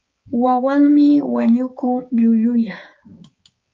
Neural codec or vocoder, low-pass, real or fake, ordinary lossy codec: codec, 16 kHz, 4 kbps, X-Codec, HuBERT features, trained on balanced general audio; 7.2 kHz; fake; Opus, 24 kbps